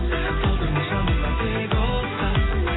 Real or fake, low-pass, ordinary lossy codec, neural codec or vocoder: real; 7.2 kHz; AAC, 16 kbps; none